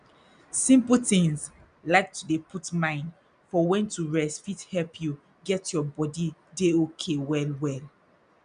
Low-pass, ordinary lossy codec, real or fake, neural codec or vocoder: 9.9 kHz; none; real; none